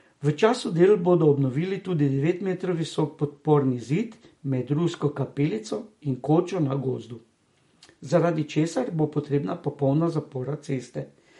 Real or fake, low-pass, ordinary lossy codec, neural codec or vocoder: real; 19.8 kHz; MP3, 48 kbps; none